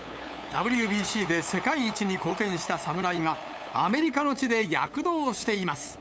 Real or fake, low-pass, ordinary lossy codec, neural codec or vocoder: fake; none; none; codec, 16 kHz, 8 kbps, FunCodec, trained on LibriTTS, 25 frames a second